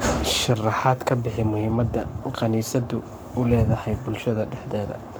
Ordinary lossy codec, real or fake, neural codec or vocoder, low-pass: none; fake; codec, 44.1 kHz, 7.8 kbps, Pupu-Codec; none